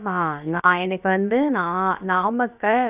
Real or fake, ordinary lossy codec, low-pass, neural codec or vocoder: fake; none; 3.6 kHz; codec, 16 kHz, about 1 kbps, DyCAST, with the encoder's durations